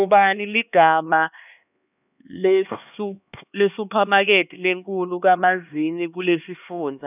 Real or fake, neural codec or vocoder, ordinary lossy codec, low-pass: fake; codec, 16 kHz, 2 kbps, X-Codec, HuBERT features, trained on LibriSpeech; none; 3.6 kHz